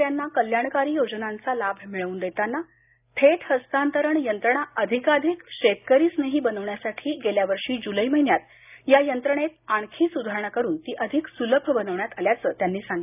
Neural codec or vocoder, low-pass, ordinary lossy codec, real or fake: none; 3.6 kHz; none; real